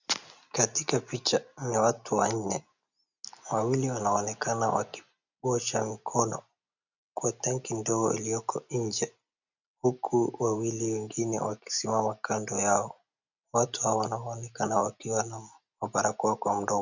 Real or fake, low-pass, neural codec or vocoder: real; 7.2 kHz; none